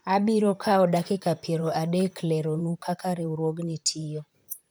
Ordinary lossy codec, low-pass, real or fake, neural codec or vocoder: none; none; fake; vocoder, 44.1 kHz, 128 mel bands, Pupu-Vocoder